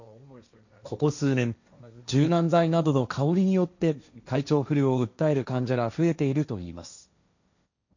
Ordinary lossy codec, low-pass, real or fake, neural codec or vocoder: none; 7.2 kHz; fake; codec, 16 kHz, 1.1 kbps, Voila-Tokenizer